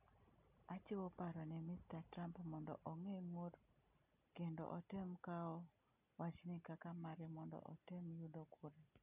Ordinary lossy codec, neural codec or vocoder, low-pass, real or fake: none; none; 3.6 kHz; real